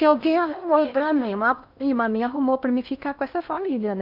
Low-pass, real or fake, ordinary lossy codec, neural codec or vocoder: 5.4 kHz; fake; none; codec, 16 kHz in and 24 kHz out, 0.8 kbps, FocalCodec, streaming, 65536 codes